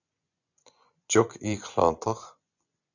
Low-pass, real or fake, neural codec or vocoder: 7.2 kHz; fake; vocoder, 24 kHz, 100 mel bands, Vocos